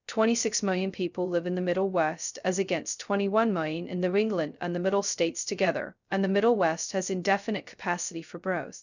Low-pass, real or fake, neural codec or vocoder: 7.2 kHz; fake; codec, 16 kHz, 0.2 kbps, FocalCodec